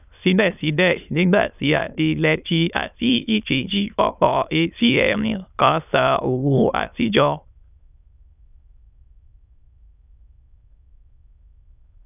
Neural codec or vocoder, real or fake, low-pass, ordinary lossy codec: autoencoder, 22.05 kHz, a latent of 192 numbers a frame, VITS, trained on many speakers; fake; 3.6 kHz; none